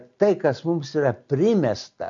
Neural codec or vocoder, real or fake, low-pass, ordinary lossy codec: none; real; 7.2 kHz; AAC, 64 kbps